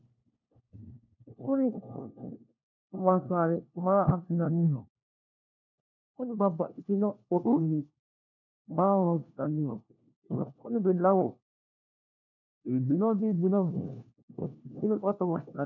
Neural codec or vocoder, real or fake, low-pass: codec, 16 kHz, 1 kbps, FunCodec, trained on LibriTTS, 50 frames a second; fake; 7.2 kHz